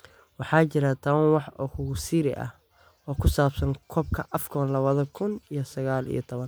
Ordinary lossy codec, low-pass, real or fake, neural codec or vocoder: none; none; real; none